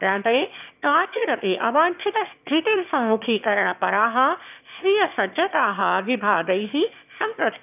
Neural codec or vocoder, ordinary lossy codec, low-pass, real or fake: autoencoder, 22.05 kHz, a latent of 192 numbers a frame, VITS, trained on one speaker; none; 3.6 kHz; fake